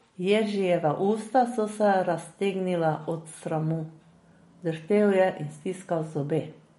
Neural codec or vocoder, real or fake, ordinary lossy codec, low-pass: none; real; MP3, 48 kbps; 19.8 kHz